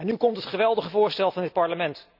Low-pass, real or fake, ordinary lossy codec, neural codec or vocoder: 5.4 kHz; real; none; none